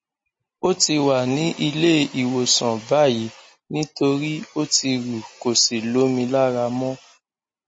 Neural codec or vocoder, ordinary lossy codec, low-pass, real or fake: none; MP3, 32 kbps; 9.9 kHz; real